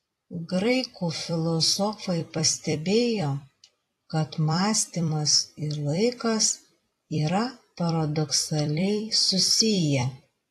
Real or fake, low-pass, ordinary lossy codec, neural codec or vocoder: fake; 14.4 kHz; AAC, 48 kbps; vocoder, 44.1 kHz, 128 mel bands every 256 samples, BigVGAN v2